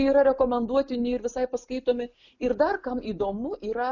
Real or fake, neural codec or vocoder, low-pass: real; none; 7.2 kHz